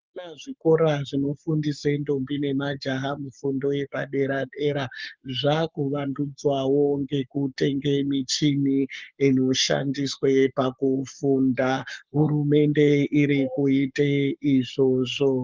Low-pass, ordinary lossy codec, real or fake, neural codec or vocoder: 7.2 kHz; Opus, 32 kbps; fake; codec, 44.1 kHz, 7.8 kbps, Pupu-Codec